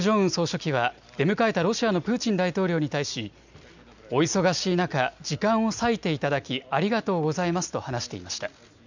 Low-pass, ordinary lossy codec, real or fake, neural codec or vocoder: 7.2 kHz; none; real; none